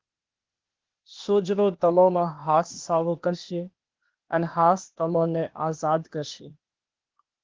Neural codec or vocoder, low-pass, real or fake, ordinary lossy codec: codec, 16 kHz, 0.8 kbps, ZipCodec; 7.2 kHz; fake; Opus, 16 kbps